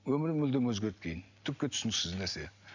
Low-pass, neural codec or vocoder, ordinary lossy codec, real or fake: 7.2 kHz; none; none; real